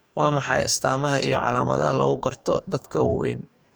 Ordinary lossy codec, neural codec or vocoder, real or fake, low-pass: none; codec, 44.1 kHz, 2.6 kbps, DAC; fake; none